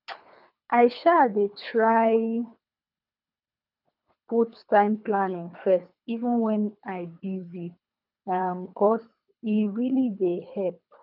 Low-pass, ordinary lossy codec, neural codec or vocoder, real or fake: 5.4 kHz; none; codec, 24 kHz, 3 kbps, HILCodec; fake